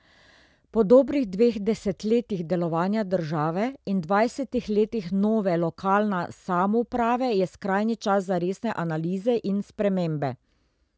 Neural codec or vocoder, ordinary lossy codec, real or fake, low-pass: none; none; real; none